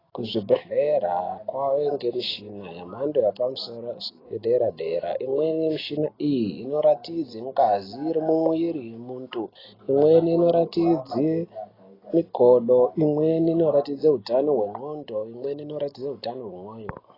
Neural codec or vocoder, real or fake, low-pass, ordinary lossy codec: none; real; 5.4 kHz; AAC, 24 kbps